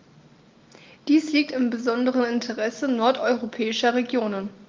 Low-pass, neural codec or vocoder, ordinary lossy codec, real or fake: 7.2 kHz; none; Opus, 32 kbps; real